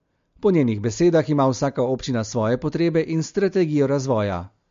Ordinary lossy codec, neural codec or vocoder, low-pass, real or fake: MP3, 48 kbps; none; 7.2 kHz; real